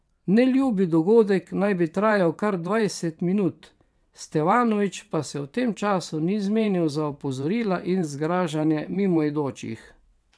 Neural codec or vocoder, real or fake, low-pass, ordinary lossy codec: vocoder, 22.05 kHz, 80 mel bands, WaveNeXt; fake; none; none